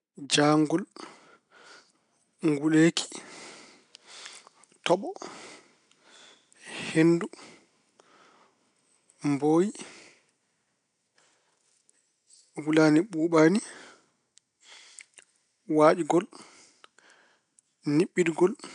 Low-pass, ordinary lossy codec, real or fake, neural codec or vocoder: 9.9 kHz; none; real; none